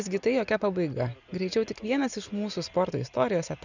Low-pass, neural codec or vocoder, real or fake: 7.2 kHz; none; real